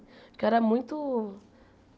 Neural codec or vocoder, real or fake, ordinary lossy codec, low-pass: none; real; none; none